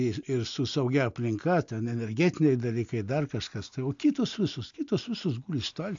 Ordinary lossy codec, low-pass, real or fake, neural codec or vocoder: AAC, 64 kbps; 7.2 kHz; real; none